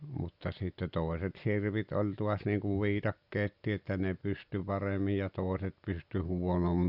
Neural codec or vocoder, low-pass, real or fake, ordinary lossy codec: none; 5.4 kHz; real; Opus, 64 kbps